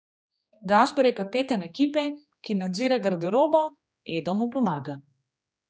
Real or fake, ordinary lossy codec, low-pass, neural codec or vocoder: fake; none; none; codec, 16 kHz, 2 kbps, X-Codec, HuBERT features, trained on general audio